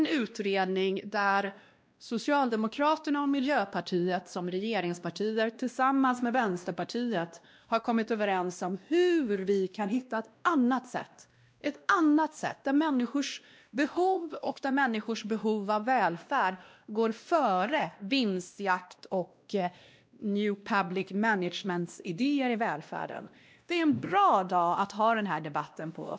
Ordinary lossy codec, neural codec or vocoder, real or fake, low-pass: none; codec, 16 kHz, 1 kbps, X-Codec, WavLM features, trained on Multilingual LibriSpeech; fake; none